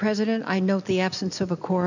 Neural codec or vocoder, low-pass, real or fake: none; 7.2 kHz; real